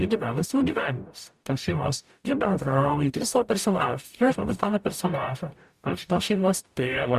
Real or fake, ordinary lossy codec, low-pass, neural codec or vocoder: fake; Opus, 64 kbps; 14.4 kHz; codec, 44.1 kHz, 0.9 kbps, DAC